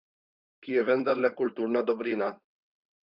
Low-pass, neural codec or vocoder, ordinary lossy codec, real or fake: 5.4 kHz; codec, 16 kHz, 4.8 kbps, FACodec; Opus, 64 kbps; fake